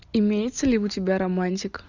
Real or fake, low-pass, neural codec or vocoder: real; 7.2 kHz; none